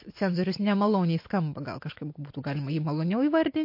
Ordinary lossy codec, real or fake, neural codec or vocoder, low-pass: MP3, 32 kbps; real; none; 5.4 kHz